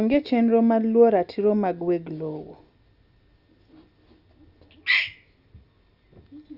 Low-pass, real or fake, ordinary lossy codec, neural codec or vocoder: 5.4 kHz; real; Opus, 64 kbps; none